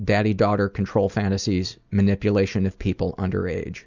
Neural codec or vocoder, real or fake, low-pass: none; real; 7.2 kHz